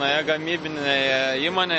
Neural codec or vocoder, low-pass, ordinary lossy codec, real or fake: none; 10.8 kHz; MP3, 32 kbps; real